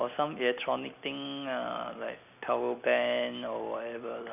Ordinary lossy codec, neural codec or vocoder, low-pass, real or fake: none; none; 3.6 kHz; real